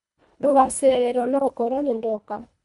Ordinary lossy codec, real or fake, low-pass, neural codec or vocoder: none; fake; 10.8 kHz; codec, 24 kHz, 1.5 kbps, HILCodec